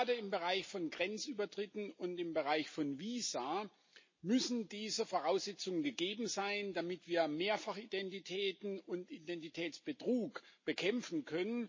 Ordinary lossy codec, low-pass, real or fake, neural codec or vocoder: MP3, 32 kbps; 7.2 kHz; real; none